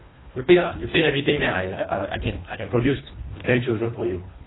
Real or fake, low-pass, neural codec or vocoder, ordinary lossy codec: fake; 7.2 kHz; codec, 24 kHz, 1.5 kbps, HILCodec; AAC, 16 kbps